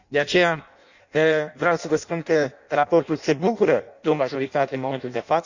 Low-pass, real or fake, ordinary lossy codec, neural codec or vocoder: 7.2 kHz; fake; none; codec, 16 kHz in and 24 kHz out, 0.6 kbps, FireRedTTS-2 codec